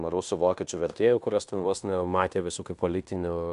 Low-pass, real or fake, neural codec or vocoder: 10.8 kHz; fake; codec, 16 kHz in and 24 kHz out, 0.9 kbps, LongCat-Audio-Codec, fine tuned four codebook decoder